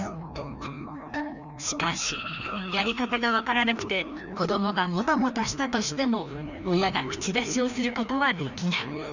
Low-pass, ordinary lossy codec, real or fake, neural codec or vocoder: 7.2 kHz; none; fake; codec, 16 kHz, 1 kbps, FreqCodec, larger model